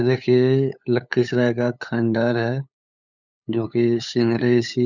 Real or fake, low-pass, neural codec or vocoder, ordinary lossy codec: fake; 7.2 kHz; codec, 16 kHz, 8 kbps, FunCodec, trained on LibriTTS, 25 frames a second; none